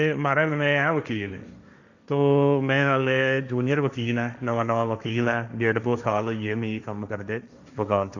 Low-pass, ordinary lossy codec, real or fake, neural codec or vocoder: 7.2 kHz; none; fake; codec, 16 kHz, 1.1 kbps, Voila-Tokenizer